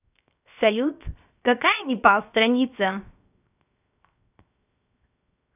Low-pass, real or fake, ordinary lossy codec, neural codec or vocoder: 3.6 kHz; fake; none; codec, 16 kHz, 0.7 kbps, FocalCodec